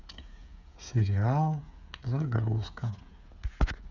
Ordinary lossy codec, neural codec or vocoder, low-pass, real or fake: none; codec, 16 kHz, 8 kbps, FreqCodec, smaller model; 7.2 kHz; fake